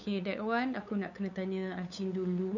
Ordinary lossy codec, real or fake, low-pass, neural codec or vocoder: none; fake; 7.2 kHz; codec, 16 kHz, 8 kbps, FunCodec, trained on LibriTTS, 25 frames a second